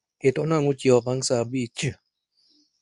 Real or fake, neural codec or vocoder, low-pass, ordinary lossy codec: fake; codec, 24 kHz, 0.9 kbps, WavTokenizer, medium speech release version 2; 10.8 kHz; none